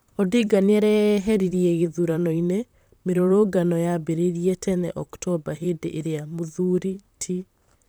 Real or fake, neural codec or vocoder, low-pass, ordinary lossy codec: fake; vocoder, 44.1 kHz, 128 mel bands, Pupu-Vocoder; none; none